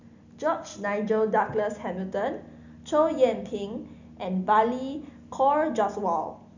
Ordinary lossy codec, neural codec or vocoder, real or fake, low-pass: none; none; real; 7.2 kHz